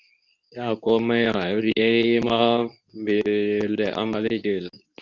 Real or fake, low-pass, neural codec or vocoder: fake; 7.2 kHz; codec, 24 kHz, 0.9 kbps, WavTokenizer, medium speech release version 2